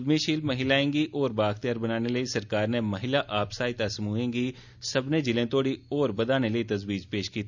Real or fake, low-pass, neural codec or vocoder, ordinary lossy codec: real; 7.2 kHz; none; none